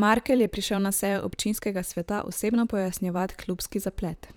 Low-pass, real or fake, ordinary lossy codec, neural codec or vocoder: none; fake; none; vocoder, 44.1 kHz, 128 mel bands, Pupu-Vocoder